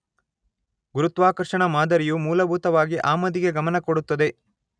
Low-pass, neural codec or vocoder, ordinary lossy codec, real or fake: 9.9 kHz; none; none; real